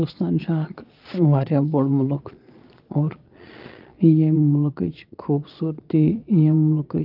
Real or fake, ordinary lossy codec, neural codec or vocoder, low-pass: fake; Opus, 24 kbps; vocoder, 22.05 kHz, 80 mel bands, Vocos; 5.4 kHz